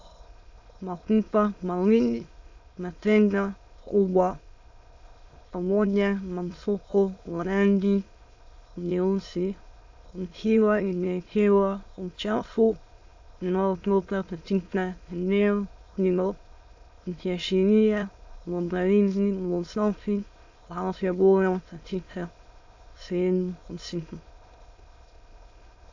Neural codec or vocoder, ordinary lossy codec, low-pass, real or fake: autoencoder, 22.05 kHz, a latent of 192 numbers a frame, VITS, trained on many speakers; AAC, 48 kbps; 7.2 kHz; fake